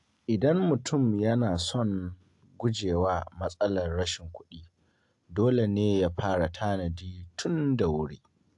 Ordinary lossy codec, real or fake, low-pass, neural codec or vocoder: AAC, 64 kbps; real; 10.8 kHz; none